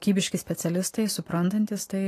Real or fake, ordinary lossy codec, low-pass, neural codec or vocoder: real; AAC, 48 kbps; 14.4 kHz; none